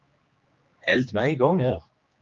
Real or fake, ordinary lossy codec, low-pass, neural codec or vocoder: fake; Opus, 32 kbps; 7.2 kHz; codec, 16 kHz, 2 kbps, X-Codec, HuBERT features, trained on general audio